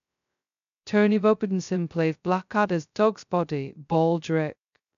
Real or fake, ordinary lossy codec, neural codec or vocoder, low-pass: fake; none; codec, 16 kHz, 0.2 kbps, FocalCodec; 7.2 kHz